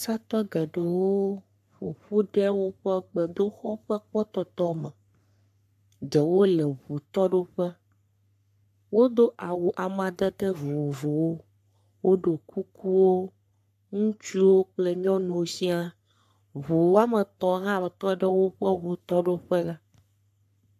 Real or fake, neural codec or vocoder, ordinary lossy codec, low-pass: fake; codec, 44.1 kHz, 3.4 kbps, Pupu-Codec; AAC, 96 kbps; 14.4 kHz